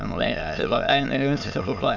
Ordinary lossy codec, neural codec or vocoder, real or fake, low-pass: none; autoencoder, 22.05 kHz, a latent of 192 numbers a frame, VITS, trained on many speakers; fake; 7.2 kHz